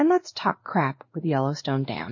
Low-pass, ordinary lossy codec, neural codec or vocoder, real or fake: 7.2 kHz; MP3, 32 kbps; codec, 16 kHz in and 24 kHz out, 1 kbps, XY-Tokenizer; fake